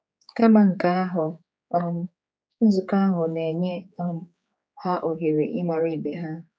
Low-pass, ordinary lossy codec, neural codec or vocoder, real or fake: none; none; codec, 16 kHz, 4 kbps, X-Codec, HuBERT features, trained on general audio; fake